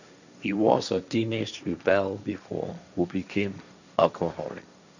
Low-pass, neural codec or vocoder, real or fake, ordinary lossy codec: 7.2 kHz; codec, 16 kHz, 1.1 kbps, Voila-Tokenizer; fake; none